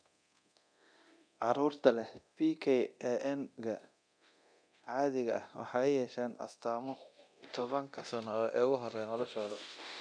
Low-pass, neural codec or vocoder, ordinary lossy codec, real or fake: 9.9 kHz; codec, 24 kHz, 0.9 kbps, DualCodec; none; fake